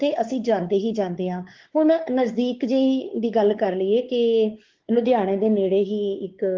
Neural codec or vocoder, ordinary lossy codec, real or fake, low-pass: codec, 16 kHz, 4 kbps, X-Codec, WavLM features, trained on Multilingual LibriSpeech; Opus, 16 kbps; fake; 7.2 kHz